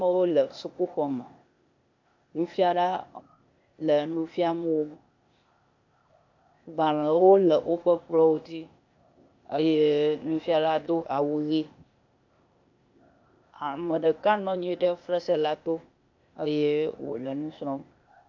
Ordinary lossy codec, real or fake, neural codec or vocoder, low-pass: AAC, 48 kbps; fake; codec, 16 kHz, 0.8 kbps, ZipCodec; 7.2 kHz